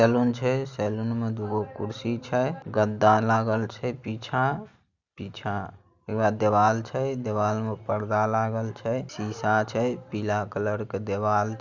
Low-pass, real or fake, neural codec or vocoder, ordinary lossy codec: 7.2 kHz; real; none; Opus, 64 kbps